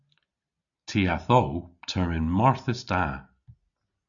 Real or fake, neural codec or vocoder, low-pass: real; none; 7.2 kHz